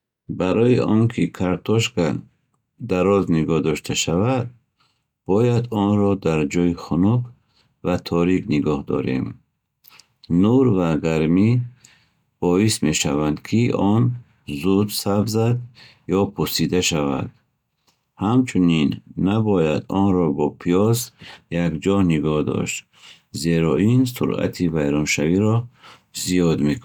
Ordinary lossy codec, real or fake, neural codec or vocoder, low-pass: none; real; none; 19.8 kHz